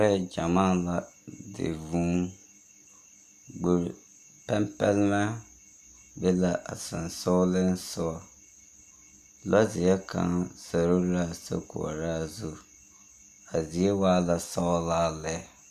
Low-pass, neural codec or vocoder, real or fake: 14.4 kHz; none; real